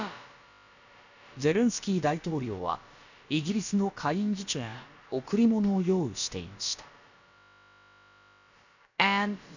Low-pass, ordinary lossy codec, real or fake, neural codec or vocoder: 7.2 kHz; none; fake; codec, 16 kHz, about 1 kbps, DyCAST, with the encoder's durations